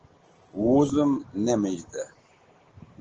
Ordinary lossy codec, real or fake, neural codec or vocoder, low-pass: Opus, 16 kbps; real; none; 7.2 kHz